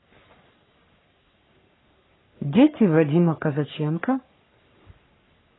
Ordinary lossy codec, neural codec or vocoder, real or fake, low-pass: AAC, 16 kbps; codec, 44.1 kHz, 3.4 kbps, Pupu-Codec; fake; 7.2 kHz